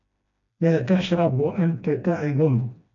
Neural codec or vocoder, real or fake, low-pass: codec, 16 kHz, 1 kbps, FreqCodec, smaller model; fake; 7.2 kHz